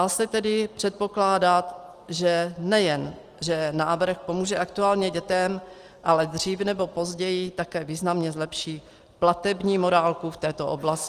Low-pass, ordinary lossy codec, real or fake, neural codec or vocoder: 14.4 kHz; Opus, 24 kbps; real; none